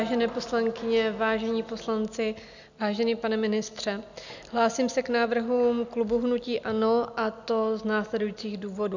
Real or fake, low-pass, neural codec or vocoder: real; 7.2 kHz; none